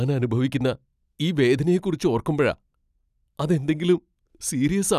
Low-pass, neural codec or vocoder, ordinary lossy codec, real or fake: 14.4 kHz; none; none; real